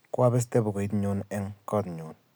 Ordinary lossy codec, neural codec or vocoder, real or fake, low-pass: none; none; real; none